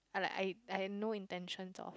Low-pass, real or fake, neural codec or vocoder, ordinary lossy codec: none; real; none; none